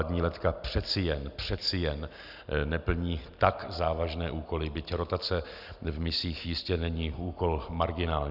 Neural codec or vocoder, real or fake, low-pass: none; real; 5.4 kHz